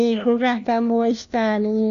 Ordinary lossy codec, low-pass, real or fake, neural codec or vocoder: MP3, 96 kbps; 7.2 kHz; fake; codec, 16 kHz, 1 kbps, FunCodec, trained on LibriTTS, 50 frames a second